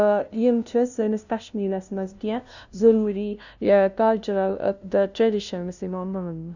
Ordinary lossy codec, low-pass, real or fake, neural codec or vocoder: none; 7.2 kHz; fake; codec, 16 kHz, 0.5 kbps, FunCodec, trained on LibriTTS, 25 frames a second